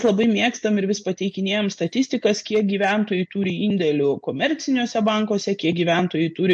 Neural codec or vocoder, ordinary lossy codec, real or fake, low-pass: none; MP3, 48 kbps; real; 9.9 kHz